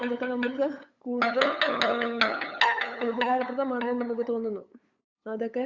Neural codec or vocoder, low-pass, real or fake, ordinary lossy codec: codec, 16 kHz, 8 kbps, FunCodec, trained on LibriTTS, 25 frames a second; 7.2 kHz; fake; Opus, 64 kbps